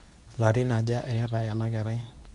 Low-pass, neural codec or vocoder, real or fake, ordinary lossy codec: 10.8 kHz; codec, 24 kHz, 0.9 kbps, WavTokenizer, medium speech release version 2; fake; MP3, 64 kbps